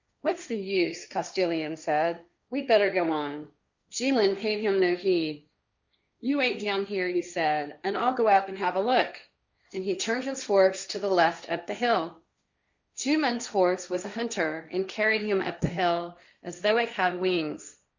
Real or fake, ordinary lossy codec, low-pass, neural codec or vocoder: fake; Opus, 64 kbps; 7.2 kHz; codec, 16 kHz, 1.1 kbps, Voila-Tokenizer